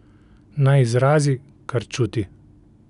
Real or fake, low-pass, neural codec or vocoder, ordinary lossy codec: real; 10.8 kHz; none; none